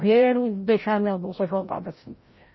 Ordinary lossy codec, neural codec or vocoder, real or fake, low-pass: MP3, 24 kbps; codec, 16 kHz, 0.5 kbps, FreqCodec, larger model; fake; 7.2 kHz